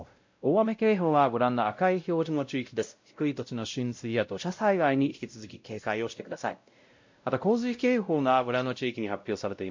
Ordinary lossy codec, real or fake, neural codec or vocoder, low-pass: MP3, 48 kbps; fake; codec, 16 kHz, 0.5 kbps, X-Codec, WavLM features, trained on Multilingual LibriSpeech; 7.2 kHz